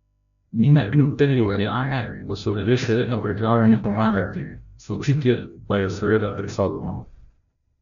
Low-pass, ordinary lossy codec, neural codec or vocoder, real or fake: 7.2 kHz; none; codec, 16 kHz, 0.5 kbps, FreqCodec, larger model; fake